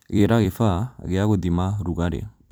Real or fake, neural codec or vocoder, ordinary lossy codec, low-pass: fake; vocoder, 44.1 kHz, 128 mel bands every 256 samples, BigVGAN v2; none; none